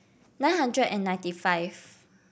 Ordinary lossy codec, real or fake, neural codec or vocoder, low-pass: none; real; none; none